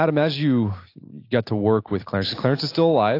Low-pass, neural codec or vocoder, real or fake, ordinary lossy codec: 5.4 kHz; none; real; AAC, 32 kbps